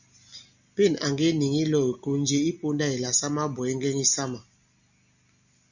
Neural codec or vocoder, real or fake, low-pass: none; real; 7.2 kHz